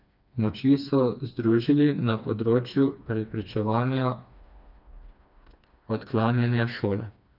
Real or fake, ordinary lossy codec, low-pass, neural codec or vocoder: fake; none; 5.4 kHz; codec, 16 kHz, 2 kbps, FreqCodec, smaller model